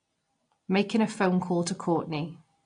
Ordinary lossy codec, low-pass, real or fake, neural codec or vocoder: AAC, 48 kbps; 9.9 kHz; real; none